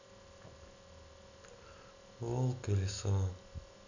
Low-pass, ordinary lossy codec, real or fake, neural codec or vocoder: 7.2 kHz; none; real; none